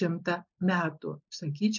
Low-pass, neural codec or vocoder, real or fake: 7.2 kHz; none; real